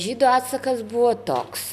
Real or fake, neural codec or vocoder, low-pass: real; none; 14.4 kHz